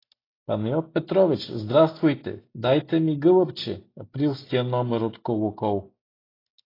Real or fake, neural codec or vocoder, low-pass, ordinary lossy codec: real; none; 5.4 kHz; AAC, 24 kbps